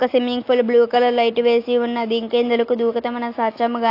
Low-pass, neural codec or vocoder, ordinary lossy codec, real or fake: 5.4 kHz; none; AAC, 32 kbps; real